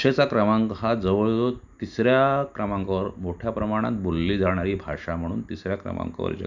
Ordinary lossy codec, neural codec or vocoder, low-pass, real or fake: none; none; 7.2 kHz; real